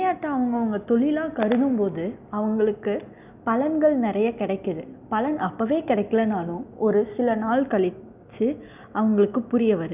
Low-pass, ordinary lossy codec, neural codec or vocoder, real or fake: 3.6 kHz; none; none; real